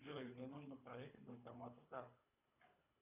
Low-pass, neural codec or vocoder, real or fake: 3.6 kHz; codec, 24 kHz, 3 kbps, HILCodec; fake